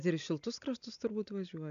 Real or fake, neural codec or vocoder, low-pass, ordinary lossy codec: real; none; 7.2 kHz; AAC, 96 kbps